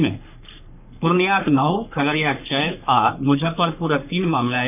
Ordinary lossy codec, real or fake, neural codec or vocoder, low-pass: none; fake; codec, 44.1 kHz, 3.4 kbps, Pupu-Codec; 3.6 kHz